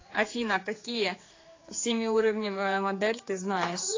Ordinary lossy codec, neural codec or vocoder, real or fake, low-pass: AAC, 32 kbps; codec, 16 kHz, 4 kbps, X-Codec, HuBERT features, trained on general audio; fake; 7.2 kHz